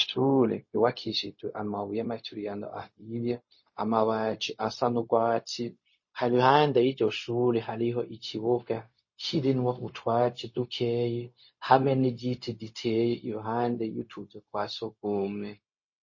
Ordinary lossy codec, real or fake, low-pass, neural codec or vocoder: MP3, 32 kbps; fake; 7.2 kHz; codec, 16 kHz, 0.4 kbps, LongCat-Audio-Codec